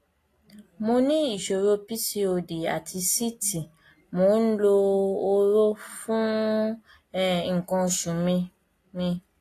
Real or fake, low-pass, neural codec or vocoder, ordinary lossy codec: real; 14.4 kHz; none; AAC, 48 kbps